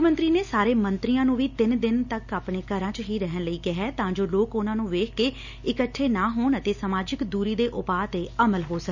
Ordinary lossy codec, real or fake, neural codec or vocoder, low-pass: none; real; none; 7.2 kHz